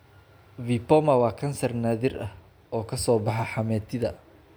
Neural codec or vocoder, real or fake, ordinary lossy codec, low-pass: none; real; none; none